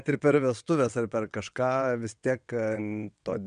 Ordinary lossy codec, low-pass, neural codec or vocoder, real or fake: MP3, 96 kbps; 9.9 kHz; vocoder, 22.05 kHz, 80 mel bands, WaveNeXt; fake